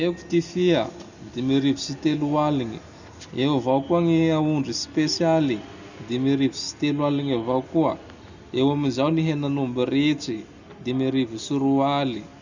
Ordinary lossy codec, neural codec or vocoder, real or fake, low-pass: none; none; real; 7.2 kHz